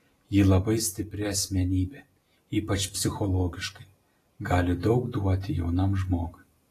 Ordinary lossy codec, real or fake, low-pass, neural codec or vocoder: AAC, 48 kbps; real; 14.4 kHz; none